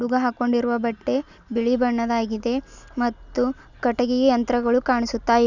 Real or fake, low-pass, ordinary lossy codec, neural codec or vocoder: real; 7.2 kHz; none; none